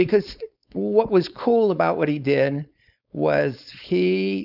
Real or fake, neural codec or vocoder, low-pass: fake; codec, 16 kHz, 4.8 kbps, FACodec; 5.4 kHz